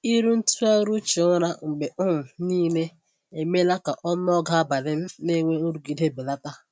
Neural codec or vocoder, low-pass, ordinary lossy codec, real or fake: none; none; none; real